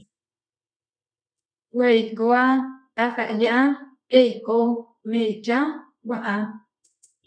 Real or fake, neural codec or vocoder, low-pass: fake; codec, 24 kHz, 0.9 kbps, WavTokenizer, medium music audio release; 9.9 kHz